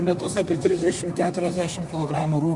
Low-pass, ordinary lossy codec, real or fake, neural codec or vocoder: 10.8 kHz; Opus, 64 kbps; fake; codec, 24 kHz, 1 kbps, SNAC